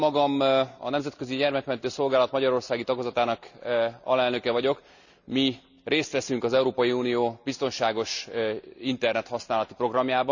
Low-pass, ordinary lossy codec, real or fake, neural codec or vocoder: 7.2 kHz; none; real; none